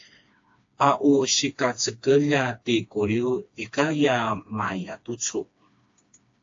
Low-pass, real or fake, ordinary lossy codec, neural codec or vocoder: 7.2 kHz; fake; AAC, 48 kbps; codec, 16 kHz, 2 kbps, FreqCodec, smaller model